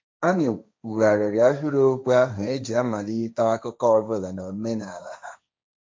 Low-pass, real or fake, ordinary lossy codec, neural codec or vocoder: none; fake; none; codec, 16 kHz, 1.1 kbps, Voila-Tokenizer